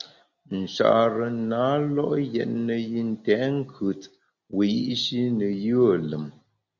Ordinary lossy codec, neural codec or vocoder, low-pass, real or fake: Opus, 64 kbps; none; 7.2 kHz; real